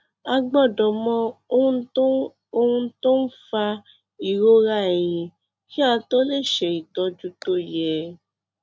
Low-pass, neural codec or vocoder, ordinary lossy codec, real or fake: none; none; none; real